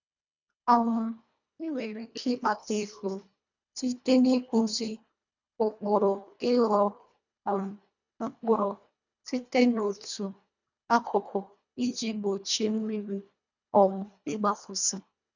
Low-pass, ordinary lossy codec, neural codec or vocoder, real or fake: 7.2 kHz; none; codec, 24 kHz, 1.5 kbps, HILCodec; fake